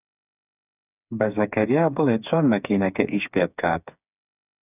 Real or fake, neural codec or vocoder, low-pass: fake; codec, 16 kHz, 4 kbps, FreqCodec, smaller model; 3.6 kHz